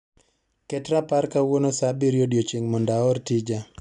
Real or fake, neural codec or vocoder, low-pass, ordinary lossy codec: real; none; 10.8 kHz; none